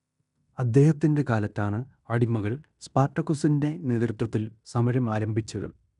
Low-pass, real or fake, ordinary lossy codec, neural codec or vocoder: 10.8 kHz; fake; none; codec, 16 kHz in and 24 kHz out, 0.9 kbps, LongCat-Audio-Codec, fine tuned four codebook decoder